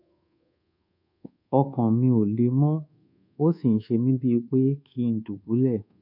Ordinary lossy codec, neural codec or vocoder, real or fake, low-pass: none; codec, 24 kHz, 1.2 kbps, DualCodec; fake; 5.4 kHz